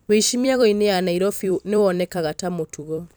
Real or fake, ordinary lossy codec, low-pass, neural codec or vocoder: fake; none; none; vocoder, 44.1 kHz, 128 mel bands every 256 samples, BigVGAN v2